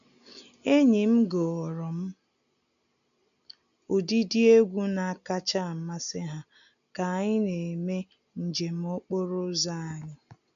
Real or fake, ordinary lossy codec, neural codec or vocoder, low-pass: real; none; none; 7.2 kHz